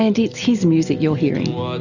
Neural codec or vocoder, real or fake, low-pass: none; real; 7.2 kHz